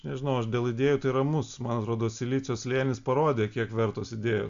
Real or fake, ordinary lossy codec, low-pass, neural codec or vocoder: real; AAC, 64 kbps; 7.2 kHz; none